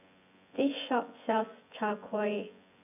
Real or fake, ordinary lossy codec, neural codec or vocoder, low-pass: fake; none; vocoder, 24 kHz, 100 mel bands, Vocos; 3.6 kHz